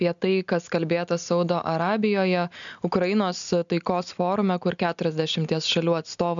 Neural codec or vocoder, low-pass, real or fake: none; 7.2 kHz; real